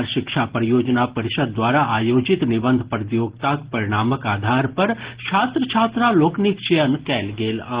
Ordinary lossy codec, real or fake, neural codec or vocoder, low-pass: Opus, 16 kbps; real; none; 3.6 kHz